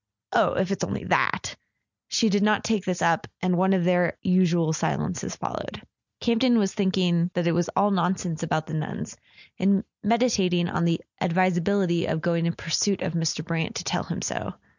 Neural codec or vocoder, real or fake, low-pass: none; real; 7.2 kHz